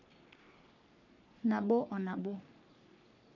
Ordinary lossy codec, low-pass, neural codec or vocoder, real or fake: none; 7.2 kHz; codec, 44.1 kHz, 3.4 kbps, Pupu-Codec; fake